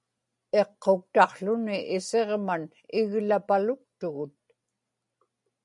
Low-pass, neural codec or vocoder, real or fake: 10.8 kHz; none; real